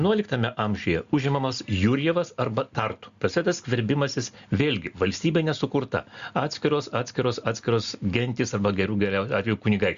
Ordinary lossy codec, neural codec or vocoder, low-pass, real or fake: Opus, 64 kbps; none; 7.2 kHz; real